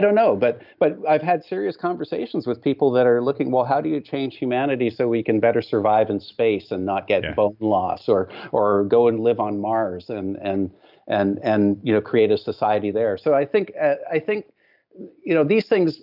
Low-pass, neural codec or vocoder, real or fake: 5.4 kHz; none; real